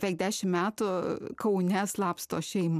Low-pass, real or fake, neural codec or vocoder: 14.4 kHz; real; none